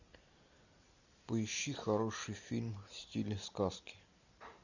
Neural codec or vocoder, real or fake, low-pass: none; real; 7.2 kHz